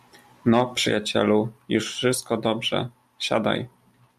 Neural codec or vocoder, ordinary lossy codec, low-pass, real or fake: none; MP3, 96 kbps; 14.4 kHz; real